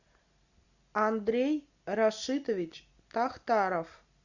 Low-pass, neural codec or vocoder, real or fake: 7.2 kHz; none; real